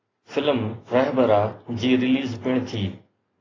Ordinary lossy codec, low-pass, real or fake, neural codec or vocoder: AAC, 32 kbps; 7.2 kHz; real; none